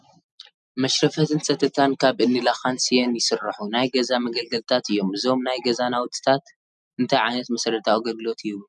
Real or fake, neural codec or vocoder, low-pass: real; none; 10.8 kHz